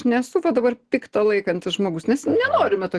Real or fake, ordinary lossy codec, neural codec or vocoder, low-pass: real; Opus, 16 kbps; none; 10.8 kHz